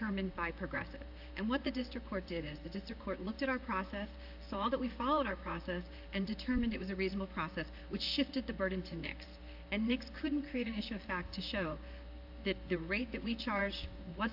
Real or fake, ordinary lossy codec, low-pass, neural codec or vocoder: fake; AAC, 48 kbps; 5.4 kHz; vocoder, 44.1 kHz, 128 mel bands, Pupu-Vocoder